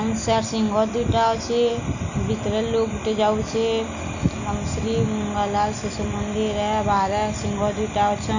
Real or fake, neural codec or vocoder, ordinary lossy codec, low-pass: real; none; none; 7.2 kHz